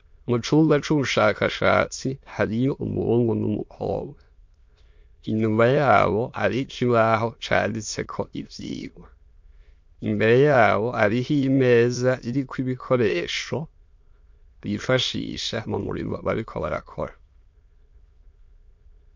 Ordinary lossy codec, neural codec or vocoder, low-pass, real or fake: MP3, 48 kbps; autoencoder, 22.05 kHz, a latent of 192 numbers a frame, VITS, trained on many speakers; 7.2 kHz; fake